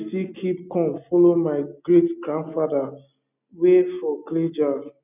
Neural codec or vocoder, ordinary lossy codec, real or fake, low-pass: none; none; real; 3.6 kHz